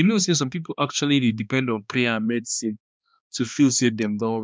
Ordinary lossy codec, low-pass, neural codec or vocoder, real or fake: none; none; codec, 16 kHz, 2 kbps, X-Codec, HuBERT features, trained on balanced general audio; fake